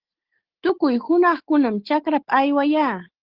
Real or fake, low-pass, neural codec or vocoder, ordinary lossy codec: real; 5.4 kHz; none; Opus, 16 kbps